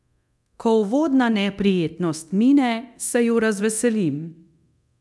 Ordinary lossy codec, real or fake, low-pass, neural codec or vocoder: none; fake; none; codec, 24 kHz, 0.9 kbps, DualCodec